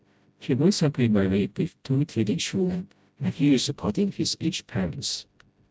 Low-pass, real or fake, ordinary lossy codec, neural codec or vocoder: none; fake; none; codec, 16 kHz, 0.5 kbps, FreqCodec, smaller model